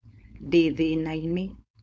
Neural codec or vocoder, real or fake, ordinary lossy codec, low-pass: codec, 16 kHz, 4.8 kbps, FACodec; fake; none; none